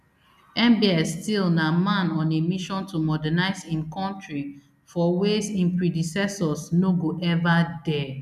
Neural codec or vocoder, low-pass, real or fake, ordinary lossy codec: none; 14.4 kHz; real; none